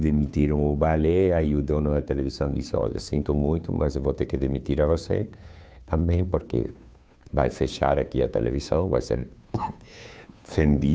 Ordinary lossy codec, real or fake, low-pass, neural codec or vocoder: none; fake; none; codec, 16 kHz, 2 kbps, FunCodec, trained on Chinese and English, 25 frames a second